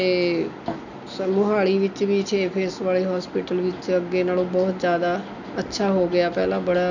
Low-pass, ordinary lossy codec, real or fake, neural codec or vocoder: 7.2 kHz; none; real; none